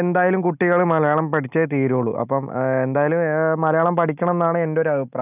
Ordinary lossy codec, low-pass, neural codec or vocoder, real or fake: none; 3.6 kHz; none; real